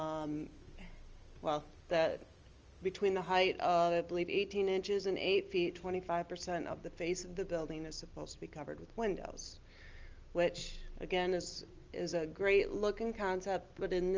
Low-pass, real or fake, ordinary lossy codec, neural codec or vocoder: 7.2 kHz; real; Opus, 24 kbps; none